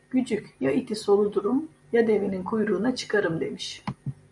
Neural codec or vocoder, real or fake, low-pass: none; real; 10.8 kHz